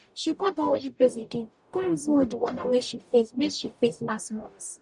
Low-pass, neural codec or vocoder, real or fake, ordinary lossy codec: 10.8 kHz; codec, 44.1 kHz, 0.9 kbps, DAC; fake; none